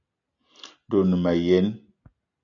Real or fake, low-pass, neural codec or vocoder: real; 7.2 kHz; none